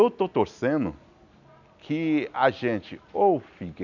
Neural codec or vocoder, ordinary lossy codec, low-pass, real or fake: none; none; 7.2 kHz; real